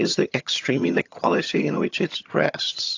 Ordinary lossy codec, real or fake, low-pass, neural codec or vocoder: AAC, 48 kbps; fake; 7.2 kHz; vocoder, 22.05 kHz, 80 mel bands, HiFi-GAN